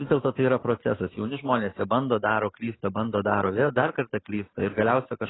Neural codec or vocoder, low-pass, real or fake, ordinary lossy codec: none; 7.2 kHz; real; AAC, 16 kbps